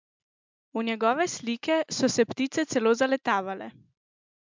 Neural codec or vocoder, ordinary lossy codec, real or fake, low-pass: none; MP3, 64 kbps; real; 7.2 kHz